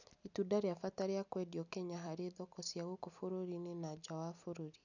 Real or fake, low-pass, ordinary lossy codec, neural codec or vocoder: real; 7.2 kHz; none; none